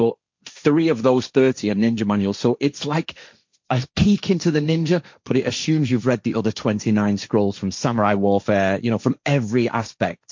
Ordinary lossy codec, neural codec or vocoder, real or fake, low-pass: MP3, 64 kbps; codec, 16 kHz, 1.1 kbps, Voila-Tokenizer; fake; 7.2 kHz